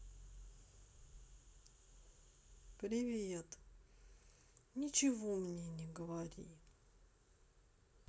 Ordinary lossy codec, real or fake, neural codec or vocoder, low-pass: none; real; none; none